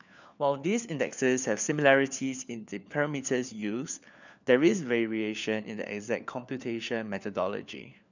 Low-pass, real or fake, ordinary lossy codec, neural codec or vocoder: 7.2 kHz; fake; none; codec, 16 kHz, 4 kbps, FunCodec, trained on LibriTTS, 50 frames a second